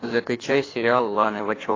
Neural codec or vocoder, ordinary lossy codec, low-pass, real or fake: codec, 16 kHz in and 24 kHz out, 1.1 kbps, FireRedTTS-2 codec; AAC, 32 kbps; 7.2 kHz; fake